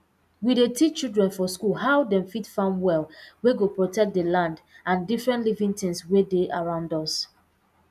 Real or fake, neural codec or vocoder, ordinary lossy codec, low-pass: real; none; none; 14.4 kHz